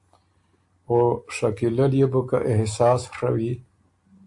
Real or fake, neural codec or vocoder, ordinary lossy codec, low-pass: real; none; AAC, 64 kbps; 10.8 kHz